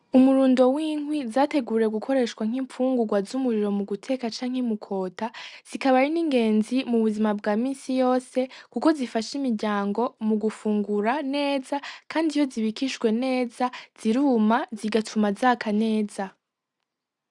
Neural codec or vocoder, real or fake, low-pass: none; real; 10.8 kHz